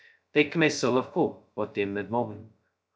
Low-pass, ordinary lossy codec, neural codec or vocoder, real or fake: none; none; codec, 16 kHz, 0.2 kbps, FocalCodec; fake